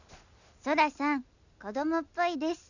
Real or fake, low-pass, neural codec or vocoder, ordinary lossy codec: real; 7.2 kHz; none; none